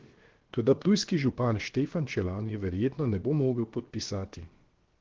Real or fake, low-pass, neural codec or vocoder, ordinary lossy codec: fake; 7.2 kHz; codec, 16 kHz, about 1 kbps, DyCAST, with the encoder's durations; Opus, 16 kbps